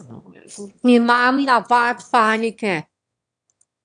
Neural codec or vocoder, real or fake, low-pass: autoencoder, 22.05 kHz, a latent of 192 numbers a frame, VITS, trained on one speaker; fake; 9.9 kHz